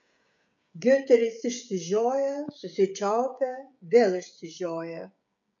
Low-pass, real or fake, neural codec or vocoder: 7.2 kHz; fake; codec, 16 kHz, 16 kbps, FreqCodec, smaller model